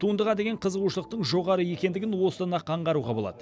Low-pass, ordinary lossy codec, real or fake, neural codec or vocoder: none; none; real; none